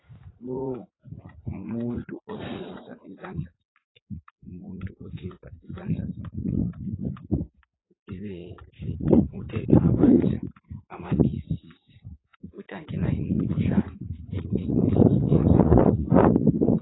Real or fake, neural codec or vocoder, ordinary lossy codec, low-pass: fake; vocoder, 44.1 kHz, 80 mel bands, Vocos; AAC, 16 kbps; 7.2 kHz